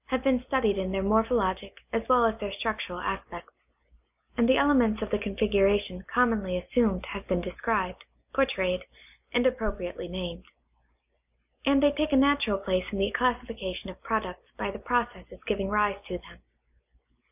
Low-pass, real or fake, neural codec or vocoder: 3.6 kHz; real; none